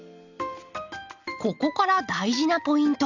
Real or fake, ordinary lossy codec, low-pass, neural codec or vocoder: real; Opus, 64 kbps; 7.2 kHz; none